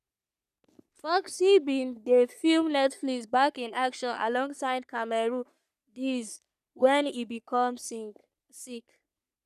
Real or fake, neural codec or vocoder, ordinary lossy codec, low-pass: fake; codec, 44.1 kHz, 3.4 kbps, Pupu-Codec; none; 14.4 kHz